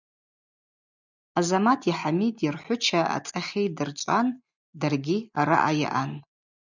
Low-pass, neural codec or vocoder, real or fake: 7.2 kHz; none; real